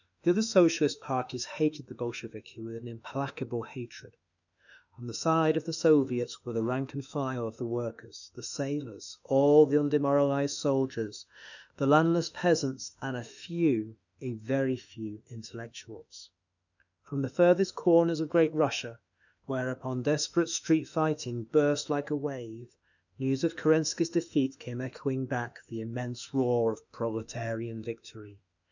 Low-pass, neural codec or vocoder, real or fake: 7.2 kHz; autoencoder, 48 kHz, 32 numbers a frame, DAC-VAE, trained on Japanese speech; fake